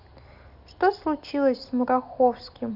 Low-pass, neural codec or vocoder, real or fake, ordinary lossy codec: 5.4 kHz; none; real; none